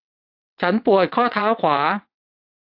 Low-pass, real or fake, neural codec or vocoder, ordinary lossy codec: 5.4 kHz; fake; vocoder, 22.05 kHz, 80 mel bands, WaveNeXt; AAC, 48 kbps